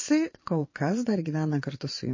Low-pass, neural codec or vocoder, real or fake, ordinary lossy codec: 7.2 kHz; vocoder, 22.05 kHz, 80 mel bands, Vocos; fake; MP3, 32 kbps